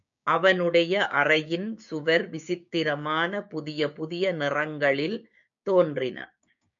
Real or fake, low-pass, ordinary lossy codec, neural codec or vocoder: fake; 7.2 kHz; MP3, 64 kbps; codec, 16 kHz, 6 kbps, DAC